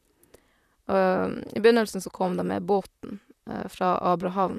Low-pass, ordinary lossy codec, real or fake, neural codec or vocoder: 14.4 kHz; none; fake; vocoder, 44.1 kHz, 128 mel bands, Pupu-Vocoder